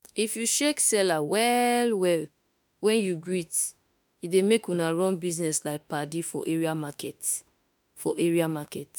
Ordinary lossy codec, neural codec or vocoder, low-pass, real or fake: none; autoencoder, 48 kHz, 32 numbers a frame, DAC-VAE, trained on Japanese speech; none; fake